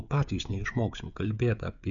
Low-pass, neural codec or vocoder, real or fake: 7.2 kHz; codec, 16 kHz, 16 kbps, FreqCodec, smaller model; fake